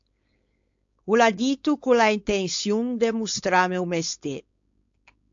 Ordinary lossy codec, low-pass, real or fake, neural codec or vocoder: AAC, 64 kbps; 7.2 kHz; fake; codec, 16 kHz, 4.8 kbps, FACodec